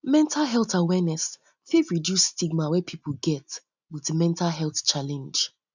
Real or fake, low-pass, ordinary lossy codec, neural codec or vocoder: real; 7.2 kHz; none; none